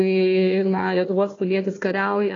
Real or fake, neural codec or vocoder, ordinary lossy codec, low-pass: fake; codec, 16 kHz, 1 kbps, FunCodec, trained on Chinese and English, 50 frames a second; AAC, 32 kbps; 7.2 kHz